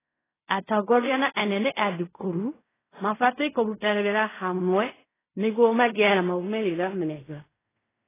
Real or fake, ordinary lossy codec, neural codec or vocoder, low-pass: fake; AAC, 16 kbps; codec, 16 kHz in and 24 kHz out, 0.4 kbps, LongCat-Audio-Codec, fine tuned four codebook decoder; 3.6 kHz